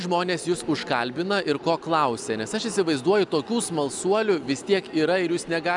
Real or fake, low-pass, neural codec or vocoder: real; 10.8 kHz; none